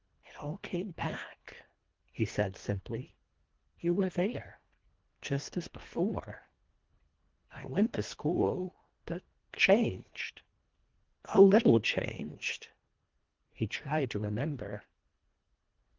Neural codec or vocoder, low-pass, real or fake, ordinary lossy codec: codec, 24 kHz, 1.5 kbps, HILCodec; 7.2 kHz; fake; Opus, 16 kbps